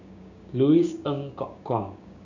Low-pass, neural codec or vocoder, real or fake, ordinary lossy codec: 7.2 kHz; codec, 16 kHz, 6 kbps, DAC; fake; none